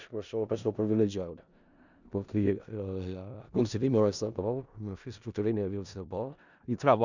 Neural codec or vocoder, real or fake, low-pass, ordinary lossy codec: codec, 16 kHz in and 24 kHz out, 0.4 kbps, LongCat-Audio-Codec, four codebook decoder; fake; 7.2 kHz; none